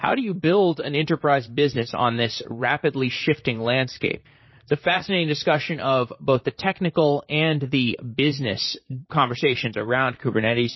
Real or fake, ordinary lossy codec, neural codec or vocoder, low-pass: fake; MP3, 24 kbps; vocoder, 44.1 kHz, 128 mel bands, Pupu-Vocoder; 7.2 kHz